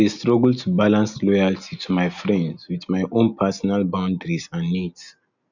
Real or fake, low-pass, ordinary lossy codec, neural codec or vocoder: real; 7.2 kHz; none; none